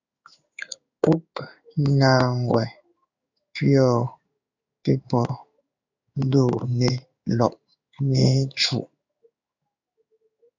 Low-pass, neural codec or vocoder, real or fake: 7.2 kHz; codec, 16 kHz in and 24 kHz out, 1 kbps, XY-Tokenizer; fake